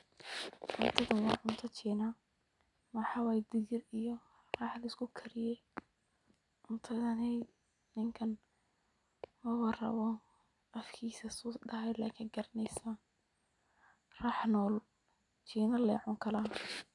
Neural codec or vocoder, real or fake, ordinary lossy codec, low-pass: none; real; none; 10.8 kHz